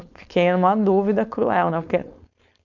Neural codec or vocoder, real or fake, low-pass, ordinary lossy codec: codec, 16 kHz, 4.8 kbps, FACodec; fake; 7.2 kHz; none